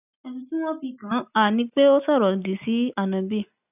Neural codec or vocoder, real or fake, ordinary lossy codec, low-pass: none; real; none; 3.6 kHz